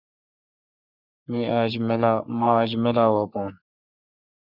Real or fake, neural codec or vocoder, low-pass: fake; codec, 44.1 kHz, 3.4 kbps, Pupu-Codec; 5.4 kHz